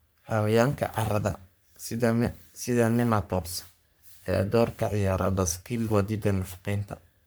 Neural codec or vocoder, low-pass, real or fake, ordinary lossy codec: codec, 44.1 kHz, 3.4 kbps, Pupu-Codec; none; fake; none